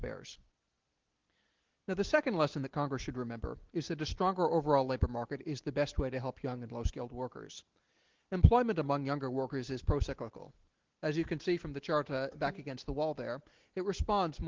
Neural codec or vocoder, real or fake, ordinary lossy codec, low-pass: none; real; Opus, 16 kbps; 7.2 kHz